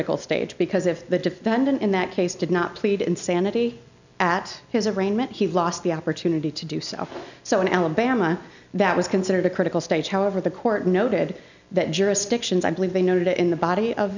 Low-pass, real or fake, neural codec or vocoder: 7.2 kHz; real; none